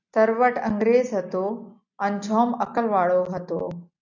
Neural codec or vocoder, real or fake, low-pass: none; real; 7.2 kHz